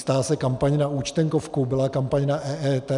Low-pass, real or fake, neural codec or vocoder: 10.8 kHz; real; none